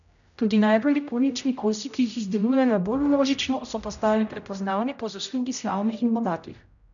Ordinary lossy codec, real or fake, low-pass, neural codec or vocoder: none; fake; 7.2 kHz; codec, 16 kHz, 0.5 kbps, X-Codec, HuBERT features, trained on general audio